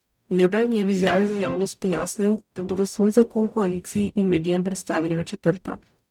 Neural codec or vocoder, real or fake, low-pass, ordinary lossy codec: codec, 44.1 kHz, 0.9 kbps, DAC; fake; 19.8 kHz; none